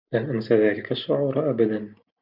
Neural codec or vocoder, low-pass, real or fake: none; 5.4 kHz; real